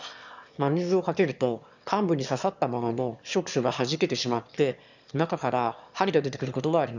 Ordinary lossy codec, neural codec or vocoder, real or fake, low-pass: none; autoencoder, 22.05 kHz, a latent of 192 numbers a frame, VITS, trained on one speaker; fake; 7.2 kHz